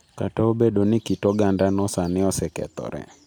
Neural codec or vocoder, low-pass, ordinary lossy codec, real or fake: none; none; none; real